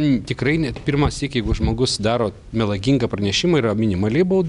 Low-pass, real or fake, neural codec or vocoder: 10.8 kHz; real; none